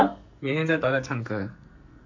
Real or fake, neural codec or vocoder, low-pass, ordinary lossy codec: fake; codec, 44.1 kHz, 2.6 kbps, SNAC; 7.2 kHz; MP3, 48 kbps